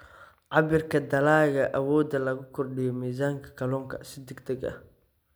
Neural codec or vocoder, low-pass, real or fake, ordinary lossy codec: none; none; real; none